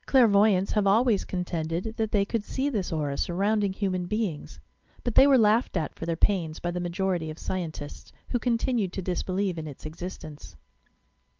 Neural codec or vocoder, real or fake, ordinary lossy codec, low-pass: none; real; Opus, 32 kbps; 7.2 kHz